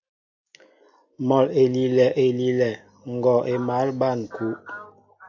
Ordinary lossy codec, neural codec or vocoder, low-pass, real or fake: AAC, 48 kbps; none; 7.2 kHz; real